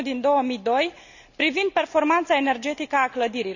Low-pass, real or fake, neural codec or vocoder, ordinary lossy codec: 7.2 kHz; real; none; none